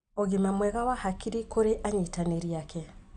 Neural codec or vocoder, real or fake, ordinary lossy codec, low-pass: none; real; none; 9.9 kHz